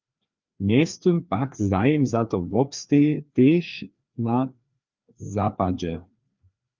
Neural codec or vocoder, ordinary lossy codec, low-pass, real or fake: codec, 16 kHz, 2 kbps, FreqCodec, larger model; Opus, 24 kbps; 7.2 kHz; fake